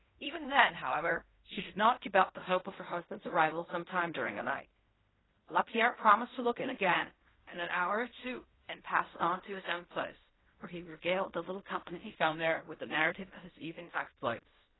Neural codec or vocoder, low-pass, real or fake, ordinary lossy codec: codec, 16 kHz in and 24 kHz out, 0.4 kbps, LongCat-Audio-Codec, fine tuned four codebook decoder; 7.2 kHz; fake; AAC, 16 kbps